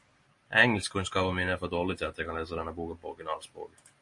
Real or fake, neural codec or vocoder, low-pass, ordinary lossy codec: real; none; 10.8 kHz; MP3, 48 kbps